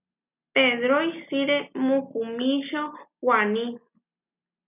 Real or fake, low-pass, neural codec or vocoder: real; 3.6 kHz; none